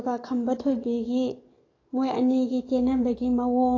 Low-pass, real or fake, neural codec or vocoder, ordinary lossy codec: 7.2 kHz; fake; codec, 44.1 kHz, 7.8 kbps, DAC; AAC, 32 kbps